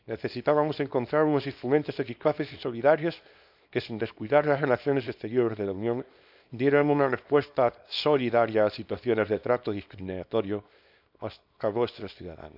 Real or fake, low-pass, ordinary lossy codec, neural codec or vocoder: fake; 5.4 kHz; none; codec, 24 kHz, 0.9 kbps, WavTokenizer, small release